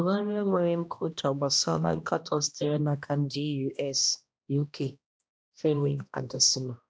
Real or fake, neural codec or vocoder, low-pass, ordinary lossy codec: fake; codec, 16 kHz, 1 kbps, X-Codec, HuBERT features, trained on balanced general audio; none; none